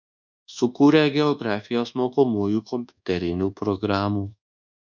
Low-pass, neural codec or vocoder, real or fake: 7.2 kHz; codec, 24 kHz, 1.2 kbps, DualCodec; fake